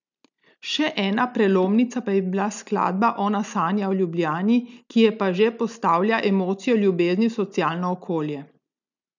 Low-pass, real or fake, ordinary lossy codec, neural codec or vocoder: 7.2 kHz; real; none; none